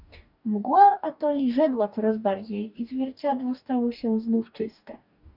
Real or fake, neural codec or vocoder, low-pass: fake; codec, 44.1 kHz, 2.6 kbps, DAC; 5.4 kHz